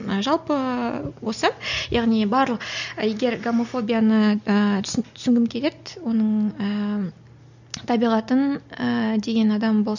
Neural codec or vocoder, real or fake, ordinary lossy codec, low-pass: none; real; none; 7.2 kHz